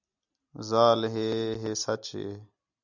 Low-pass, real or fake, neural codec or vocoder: 7.2 kHz; real; none